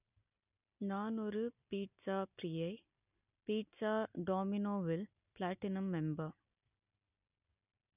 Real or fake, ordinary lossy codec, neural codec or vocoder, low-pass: real; none; none; 3.6 kHz